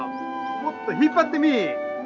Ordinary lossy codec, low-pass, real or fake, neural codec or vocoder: none; 7.2 kHz; real; none